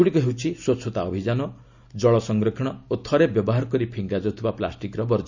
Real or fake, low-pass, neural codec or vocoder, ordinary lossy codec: real; 7.2 kHz; none; none